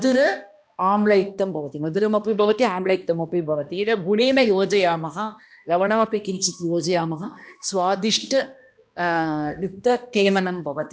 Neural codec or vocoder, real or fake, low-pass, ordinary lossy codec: codec, 16 kHz, 1 kbps, X-Codec, HuBERT features, trained on balanced general audio; fake; none; none